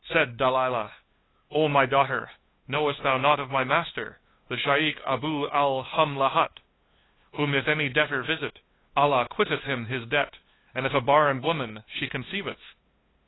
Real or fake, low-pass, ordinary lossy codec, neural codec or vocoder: fake; 7.2 kHz; AAC, 16 kbps; codec, 16 kHz, 2 kbps, FunCodec, trained on LibriTTS, 25 frames a second